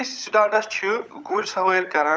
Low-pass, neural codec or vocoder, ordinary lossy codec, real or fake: none; codec, 16 kHz, 16 kbps, FunCodec, trained on LibriTTS, 50 frames a second; none; fake